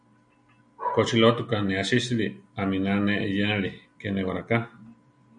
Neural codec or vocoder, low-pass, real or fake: none; 9.9 kHz; real